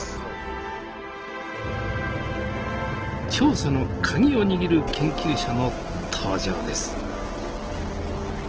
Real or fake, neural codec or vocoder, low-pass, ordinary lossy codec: real; none; 7.2 kHz; Opus, 16 kbps